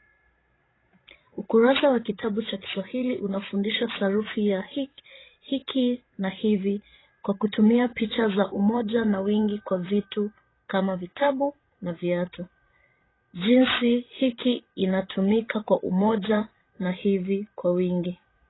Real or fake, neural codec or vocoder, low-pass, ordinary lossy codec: fake; codec, 16 kHz, 16 kbps, FreqCodec, larger model; 7.2 kHz; AAC, 16 kbps